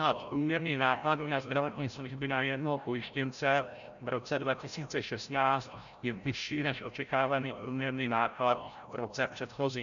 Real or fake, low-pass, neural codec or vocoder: fake; 7.2 kHz; codec, 16 kHz, 0.5 kbps, FreqCodec, larger model